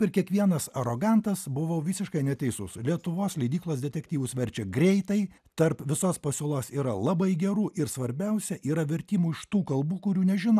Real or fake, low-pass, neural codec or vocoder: real; 14.4 kHz; none